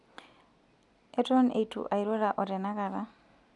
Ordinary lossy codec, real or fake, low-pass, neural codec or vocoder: none; real; 10.8 kHz; none